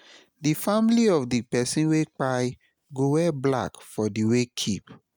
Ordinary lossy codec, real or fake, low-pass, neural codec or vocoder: none; real; none; none